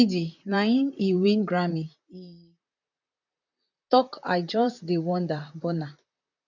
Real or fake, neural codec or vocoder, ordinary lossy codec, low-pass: fake; vocoder, 44.1 kHz, 128 mel bands, Pupu-Vocoder; none; 7.2 kHz